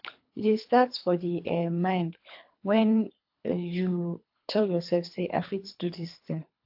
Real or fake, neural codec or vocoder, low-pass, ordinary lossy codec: fake; codec, 24 kHz, 3 kbps, HILCodec; 5.4 kHz; AAC, 48 kbps